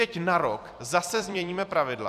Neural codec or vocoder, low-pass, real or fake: vocoder, 44.1 kHz, 128 mel bands every 256 samples, BigVGAN v2; 14.4 kHz; fake